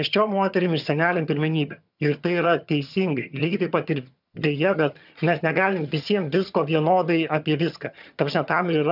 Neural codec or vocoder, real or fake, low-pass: vocoder, 22.05 kHz, 80 mel bands, HiFi-GAN; fake; 5.4 kHz